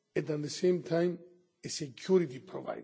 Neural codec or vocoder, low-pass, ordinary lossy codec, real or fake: none; none; none; real